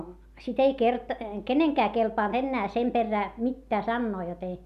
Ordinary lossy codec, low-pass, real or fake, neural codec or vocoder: none; 14.4 kHz; real; none